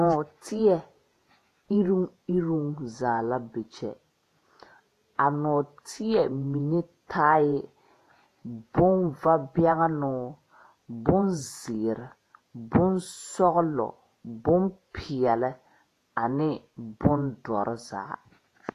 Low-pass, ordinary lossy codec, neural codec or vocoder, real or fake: 14.4 kHz; AAC, 48 kbps; vocoder, 48 kHz, 128 mel bands, Vocos; fake